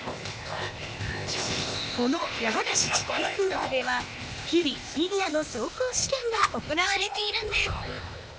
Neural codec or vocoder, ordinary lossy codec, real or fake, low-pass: codec, 16 kHz, 0.8 kbps, ZipCodec; none; fake; none